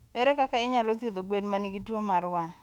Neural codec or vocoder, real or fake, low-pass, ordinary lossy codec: autoencoder, 48 kHz, 32 numbers a frame, DAC-VAE, trained on Japanese speech; fake; 19.8 kHz; none